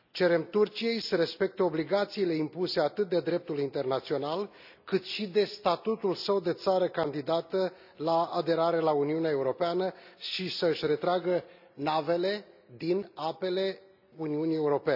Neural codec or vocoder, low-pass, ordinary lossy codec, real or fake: none; 5.4 kHz; none; real